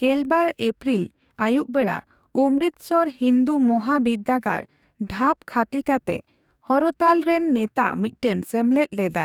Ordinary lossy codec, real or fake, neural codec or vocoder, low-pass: none; fake; codec, 44.1 kHz, 2.6 kbps, DAC; 19.8 kHz